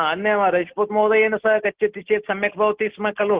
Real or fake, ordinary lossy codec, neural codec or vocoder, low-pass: real; Opus, 16 kbps; none; 3.6 kHz